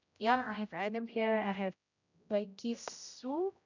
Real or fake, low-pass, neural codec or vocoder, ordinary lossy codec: fake; 7.2 kHz; codec, 16 kHz, 0.5 kbps, X-Codec, HuBERT features, trained on general audio; none